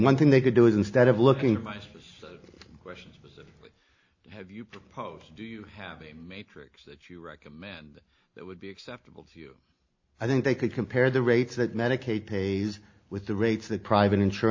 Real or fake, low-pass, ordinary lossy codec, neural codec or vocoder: real; 7.2 kHz; AAC, 48 kbps; none